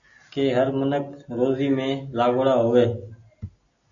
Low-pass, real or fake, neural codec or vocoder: 7.2 kHz; real; none